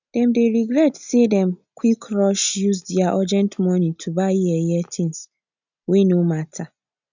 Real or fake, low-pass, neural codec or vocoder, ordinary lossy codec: real; 7.2 kHz; none; none